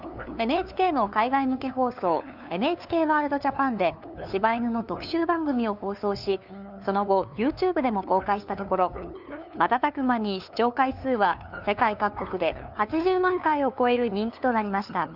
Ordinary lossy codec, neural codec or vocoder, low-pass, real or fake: none; codec, 16 kHz, 2 kbps, FunCodec, trained on LibriTTS, 25 frames a second; 5.4 kHz; fake